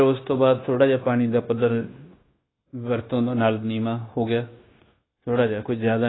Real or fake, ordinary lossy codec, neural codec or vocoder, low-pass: fake; AAC, 16 kbps; codec, 16 kHz, 0.9 kbps, LongCat-Audio-Codec; 7.2 kHz